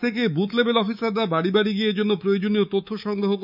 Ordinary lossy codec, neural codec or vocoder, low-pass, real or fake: none; codec, 24 kHz, 3.1 kbps, DualCodec; 5.4 kHz; fake